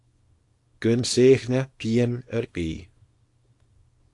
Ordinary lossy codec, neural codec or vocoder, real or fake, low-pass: AAC, 48 kbps; codec, 24 kHz, 0.9 kbps, WavTokenizer, small release; fake; 10.8 kHz